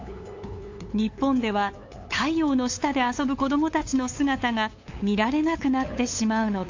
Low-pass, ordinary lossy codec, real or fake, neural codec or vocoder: 7.2 kHz; AAC, 48 kbps; fake; codec, 16 kHz, 8 kbps, FunCodec, trained on LibriTTS, 25 frames a second